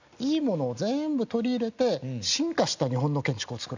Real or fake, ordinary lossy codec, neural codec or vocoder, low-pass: real; none; none; 7.2 kHz